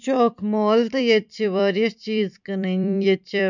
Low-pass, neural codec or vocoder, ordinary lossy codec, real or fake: 7.2 kHz; vocoder, 44.1 kHz, 128 mel bands every 256 samples, BigVGAN v2; none; fake